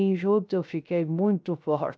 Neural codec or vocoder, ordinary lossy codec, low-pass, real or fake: codec, 16 kHz, 0.3 kbps, FocalCodec; none; none; fake